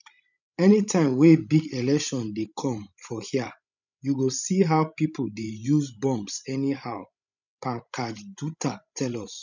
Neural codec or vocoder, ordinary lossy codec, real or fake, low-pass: codec, 16 kHz, 16 kbps, FreqCodec, larger model; none; fake; 7.2 kHz